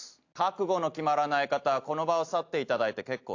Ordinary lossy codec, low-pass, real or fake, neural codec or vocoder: AAC, 48 kbps; 7.2 kHz; real; none